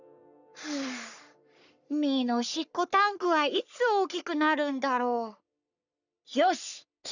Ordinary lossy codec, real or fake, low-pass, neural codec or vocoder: none; fake; 7.2 kHz; codec, 44.1 kHz, 3.4 kbps, Pupu-Codec